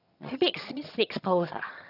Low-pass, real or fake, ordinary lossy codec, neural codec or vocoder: 5.4 kHz; fake; none; vocoder, 22.05 kHz, 80 mel bands, HiFi-GAN